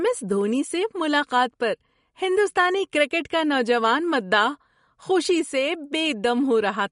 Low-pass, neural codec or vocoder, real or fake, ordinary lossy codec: 10.8 kHz; none; real; MP3, 48 kbps